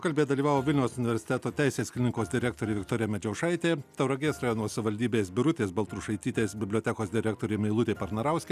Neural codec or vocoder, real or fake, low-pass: none; real; 14.4 kHz